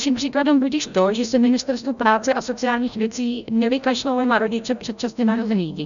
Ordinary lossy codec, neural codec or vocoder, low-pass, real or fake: MP3, 96 kbps; codec, 16 kHz, 0.5 kbps, FreqCodec, larger model; 7.2 kHz; fake